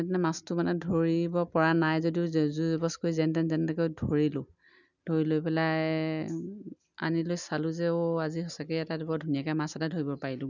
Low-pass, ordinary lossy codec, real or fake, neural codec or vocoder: 7.2 kHz; none; real; none